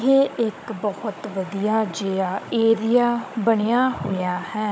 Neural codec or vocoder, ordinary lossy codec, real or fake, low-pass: codec, 16 kHz, 16 kbps, FunCodec, trained on Chinese and English, 50 frames a second; none; fake; none